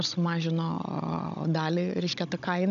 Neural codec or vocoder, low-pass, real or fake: codec, 16 kHz, 16 kbps, FunCodec, trained on Chinese and English, 50 frames a second; 7.2 kHz; fake